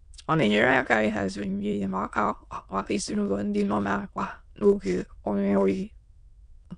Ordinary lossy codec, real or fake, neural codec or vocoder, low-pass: none; fake; autoencoder, 22.05 kHz, a latent of 192 numbers a frame, VITS, trained on many speakers; 9.9 kHz